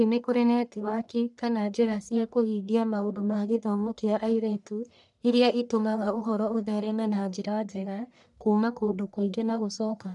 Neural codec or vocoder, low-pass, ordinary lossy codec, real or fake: codec, 44.1 kHz, 1.7 kbps, Pupu-Codec; 10.8 kHz; none; fake